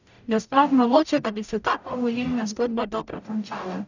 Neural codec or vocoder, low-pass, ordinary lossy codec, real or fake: codec, 44.1 kHz, 0.9 kbps, DAC; 7.2 kHz; none; fake